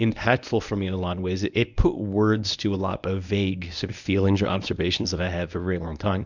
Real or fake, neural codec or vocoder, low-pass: fake; codec, 24 kHz, 0.9 kbps, WavTokenizer, medium speech release version 1; 7.2 kHz